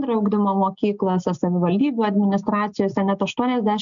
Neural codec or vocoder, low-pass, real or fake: none; 7.2 kHz; real